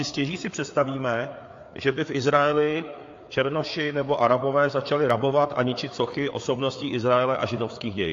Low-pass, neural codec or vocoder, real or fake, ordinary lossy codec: 7.2 kHz; codec, 16 kHz, 4 kbps, FreqCodec, larger model; fake; AAC, 48 kbps